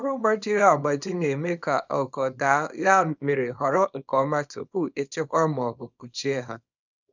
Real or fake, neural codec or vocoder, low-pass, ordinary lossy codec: fake; codec, 24 kHz, 0.9 kbps, WavTokenizer, small release; 7.2 kHz; none